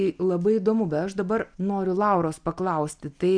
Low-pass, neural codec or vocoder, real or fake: 9.9 kHz; none; real